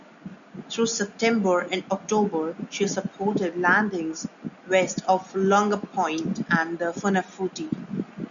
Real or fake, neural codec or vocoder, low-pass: real; none; 7.2 kHz